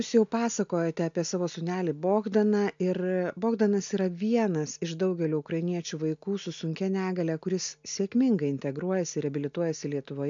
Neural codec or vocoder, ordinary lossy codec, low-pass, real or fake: none; MP3, 64 kbps; 7.2 kHz; real